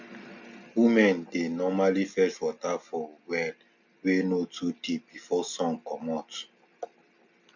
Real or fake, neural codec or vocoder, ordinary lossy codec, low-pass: real; none; MP3, 64 kbps; 7.2 kHz